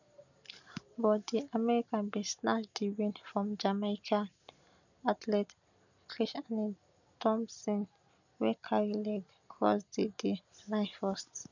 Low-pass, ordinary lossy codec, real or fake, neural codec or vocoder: 7.2 kHz; none; real; none